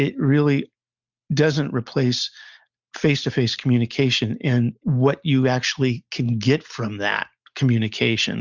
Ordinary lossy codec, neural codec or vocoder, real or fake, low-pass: Opus, 64 kbps; none; real; 7.2 kHz